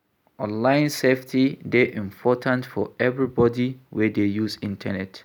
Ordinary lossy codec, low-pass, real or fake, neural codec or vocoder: none; none; real; none